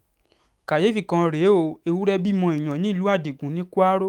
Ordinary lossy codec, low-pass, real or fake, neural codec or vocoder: Opus, 32 kbps; 19.8 kHz; fake; autoencoder, 48 kHz, 128 numbers a frame, DAC-VAE, trained on Japanese speech